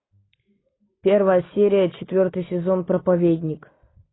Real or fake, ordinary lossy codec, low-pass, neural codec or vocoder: real; AAC, 16 kbps; 7.2 kHz; none